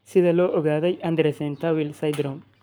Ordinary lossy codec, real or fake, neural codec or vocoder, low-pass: none; fake; codec, 44.1 kHz, 7.8 kbps, Pupu-Codec; none